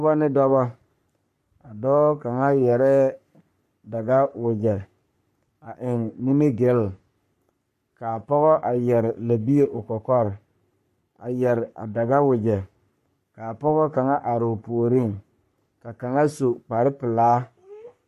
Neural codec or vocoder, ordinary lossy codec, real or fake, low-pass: codec, 44.1 kHz, 3.4 kbps, Pupu-Codec; MP3, 64 kbps; fake; 14.4 kHz